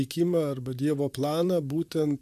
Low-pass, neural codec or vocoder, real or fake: 14.4 kHz; vocoder, 44.1 kHz, 128 mel bands, Pupu-Vocoder; fake